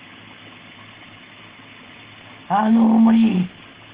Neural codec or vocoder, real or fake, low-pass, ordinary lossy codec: none; real; 3.6 kHz; Opus, 16 kbps